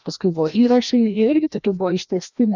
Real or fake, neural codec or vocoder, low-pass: fake; codec, 16 kHz, 1 kbps, FreqCodec, larger model; 7.2 kHz